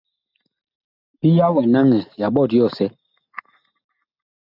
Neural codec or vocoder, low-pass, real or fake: none; 5.4 kHz; real